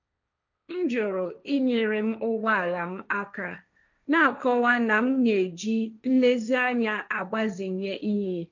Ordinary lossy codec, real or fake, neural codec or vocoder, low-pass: none; fake; codec, 16 kHz, 1.1 kbps, Voila-Tokenizer; 7.2 kHz